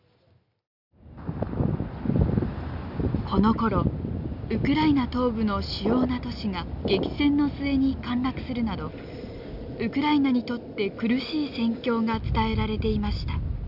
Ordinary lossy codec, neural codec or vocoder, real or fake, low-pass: none; none; real; 5.4 kHz